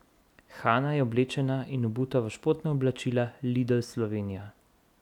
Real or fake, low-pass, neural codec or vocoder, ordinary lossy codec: real; 19.8 kHz; none; none